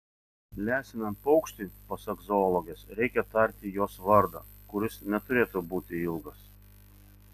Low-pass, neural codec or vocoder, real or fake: 14.4 kHz; none; real